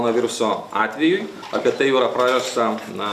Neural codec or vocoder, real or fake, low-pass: none; real; 14.4 kHz